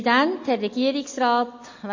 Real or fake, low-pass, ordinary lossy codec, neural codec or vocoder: real; 7.2 kHz; MP3, 32 kbps; none